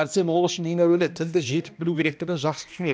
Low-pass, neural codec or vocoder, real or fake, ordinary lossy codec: none; codec, 16 kHz, 1 kbps, X-Codec, HuBERT features, trained on balanced general audio; fake; none